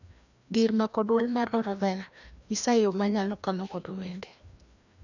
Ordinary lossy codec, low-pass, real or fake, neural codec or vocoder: none; 7.2 kHz; fake; codec, 16 kHz, 1 kbps, FreqCodec, larger model